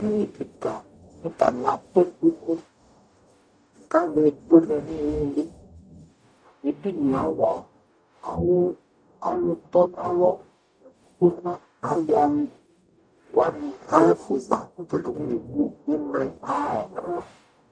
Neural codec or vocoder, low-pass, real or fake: codec, 44.1 kHz, 0.9 kbps, DAC; 9.9 kHz; fake